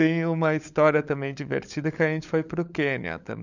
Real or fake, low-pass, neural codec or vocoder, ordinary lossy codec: fake; 7.2 kHz; codec, 16 kHz, 16 kbps, FunCodec, trained on LibriTTS, 50 frames a second; none